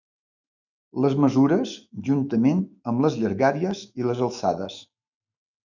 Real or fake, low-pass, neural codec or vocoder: fake; 7.2 kHz; autoencoder, 48 kHz, 128 numbers a frame, DAC-VAE, trained on Japanese speech